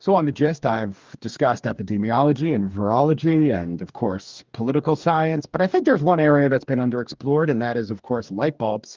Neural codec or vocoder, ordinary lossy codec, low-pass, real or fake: codec, 44.1 kHz, 2.6 kbps, DAC; Opus, 32 kbps; 7.2 kHz; fake